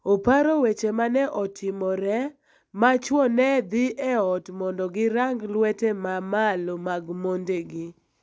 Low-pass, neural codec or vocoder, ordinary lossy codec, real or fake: none; none; none; real